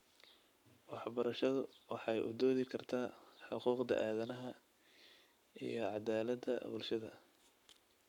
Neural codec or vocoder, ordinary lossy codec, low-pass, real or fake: codec, 44.1 kHz, 7.8 kbps, Pupu-Codec; none; 19.8 kHz; fake